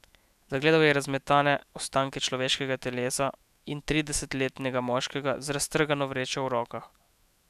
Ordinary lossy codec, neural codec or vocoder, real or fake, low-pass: none; autoencoder, 48 kHz, 128 numbers a frame, DAC-VAE, trained on Japanese speech; fake; 14.4 kHz